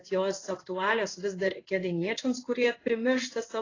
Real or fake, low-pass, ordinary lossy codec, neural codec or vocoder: fake; 7.2 kHz; AAC, 32 kbps; codec, 16 kHz in and 24 kHz out, 1 kbps, XY-Tokenizer